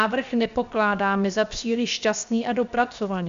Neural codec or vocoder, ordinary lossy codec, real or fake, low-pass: codec, 16 kHz, 0.7 kbps, FocalCodec; Opus, 64 kbps; fake; 7.2 kHz